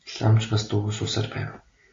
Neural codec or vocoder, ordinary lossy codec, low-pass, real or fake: none; AAC, 32 kbps; 7.2 kHz; real